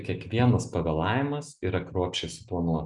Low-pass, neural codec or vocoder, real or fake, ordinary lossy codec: 10.8 kHz; none; real; MP3, 96 kbps